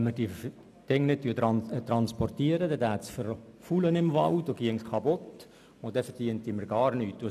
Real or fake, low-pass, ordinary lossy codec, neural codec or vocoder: real; 14.4 kHz; none; none